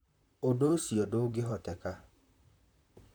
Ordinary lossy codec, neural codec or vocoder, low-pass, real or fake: none; vocoder, 44.1 kHz, 128 mel bands, Pupu-Vocoder; none; fake